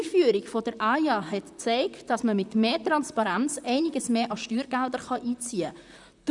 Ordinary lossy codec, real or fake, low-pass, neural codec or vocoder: none; fake; 10.8 kHz; vocoder, 44.1 kHz, 128 mel bands, Pupu-Vocoder